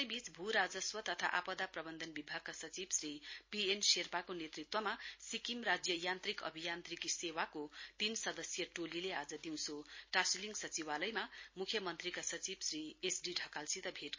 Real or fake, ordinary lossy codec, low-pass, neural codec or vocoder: real; MP3, 32 kbps; 7.2 kHz; none